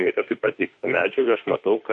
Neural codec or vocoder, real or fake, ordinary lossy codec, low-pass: autoencoder, 48 kHz, 32 numbers a frame, DAC-VAE, trained on Japanese speech; fake; MP3, 48 kbps; 10.8 kHz